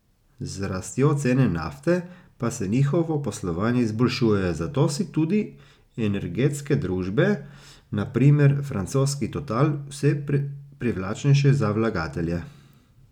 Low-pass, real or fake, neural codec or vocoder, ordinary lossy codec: 19.8 kHz; real; none; none